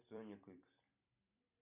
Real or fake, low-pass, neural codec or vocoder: fake; 3.6 kHz; vocoder, 44.1 kHz, 128 mel bands every 256 samples, BigVGAN v2